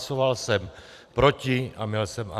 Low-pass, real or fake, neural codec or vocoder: 14.4 kHz; fake; vocoder, 44.1 kHz, 128 mel bands every 256 samples, BigVGAN v2